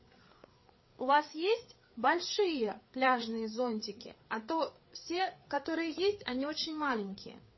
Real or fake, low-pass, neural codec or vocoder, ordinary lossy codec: fake; 7.2 kHz; codec, 16 kHz, 4 kbps, FreqCodec, larger model; MP3, 24 kbps